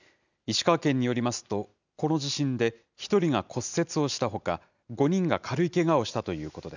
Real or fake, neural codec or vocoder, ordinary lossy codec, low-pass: real; none; none; 7.2 kHz